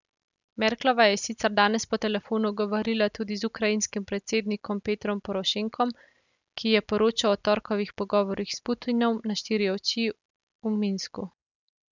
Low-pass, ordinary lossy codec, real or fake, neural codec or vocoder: 7.2 kHz; none; real; none